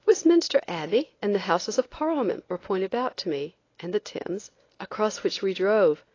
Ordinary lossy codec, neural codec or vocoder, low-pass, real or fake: AAC, 32 kbps; codec, 24 kHz, 0.9 kbps, WavTokenizer, medium speech release version 1; 7.2 kHz; fake